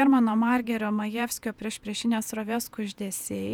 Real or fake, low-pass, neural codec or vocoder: fake; 19.8 kHz; vocoder, 48 kHz, 128 mel bands, Vocos